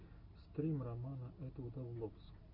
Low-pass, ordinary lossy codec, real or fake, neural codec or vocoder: 5.4 kHz; Opus, 64 kbps; real; none